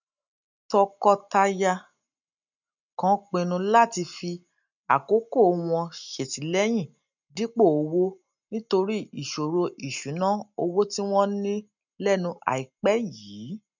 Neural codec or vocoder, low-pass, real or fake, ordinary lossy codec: none; 7.2 kHz; real; none